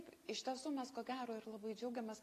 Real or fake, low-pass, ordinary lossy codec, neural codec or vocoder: real; 14.4 kHz; AAC, 48 kbps; none